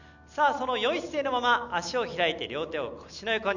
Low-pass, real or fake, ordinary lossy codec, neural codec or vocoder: 7.2 kHz; real; none; none